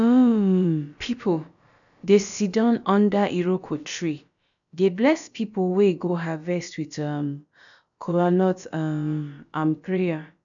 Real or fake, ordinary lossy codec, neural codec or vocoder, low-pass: fake; none; codec, 16 kHz, about 1 kbps, DyCAST, with the encoder's durations; 7.2 kHz